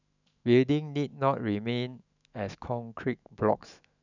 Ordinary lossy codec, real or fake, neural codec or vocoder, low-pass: none; fake; autoencoder, 48 kHz, 128 numbers a frame, DAC-VAE, trained on Japanese speech; 7.2 kHz